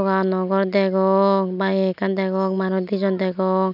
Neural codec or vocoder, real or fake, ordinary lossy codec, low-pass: none; real; none; 5.4 kHz